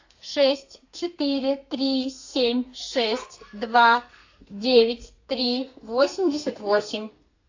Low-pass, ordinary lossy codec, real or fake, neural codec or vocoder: 7.2 kHz; AAC, 48 kbps; fake; codec, 32 kHz, 1.9 kbps, SNAC